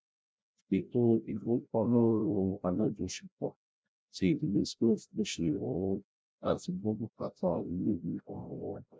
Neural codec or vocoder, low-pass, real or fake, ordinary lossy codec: codec, 16 kHz, 0.5 kbps, FreqCodec, larger model; none; fake; none